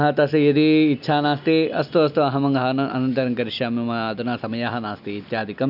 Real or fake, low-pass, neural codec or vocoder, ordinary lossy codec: real; 5.4 kHz; none; none